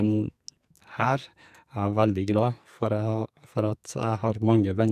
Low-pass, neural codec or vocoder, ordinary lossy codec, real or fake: 14.4 kHz; codec, 44.1 kHz, 2.6 kbps, SNAC; none; fake